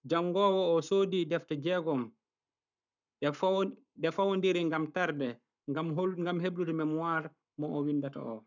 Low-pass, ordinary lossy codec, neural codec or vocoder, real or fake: 7.2 kHz; none; codec, 44.1 kHz, 7.8 kbps, Pupu-Codec; fake